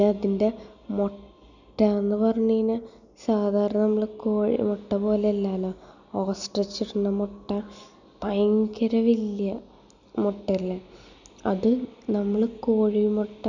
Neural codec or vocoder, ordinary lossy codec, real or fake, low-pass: none; none; real; 7.2 kHz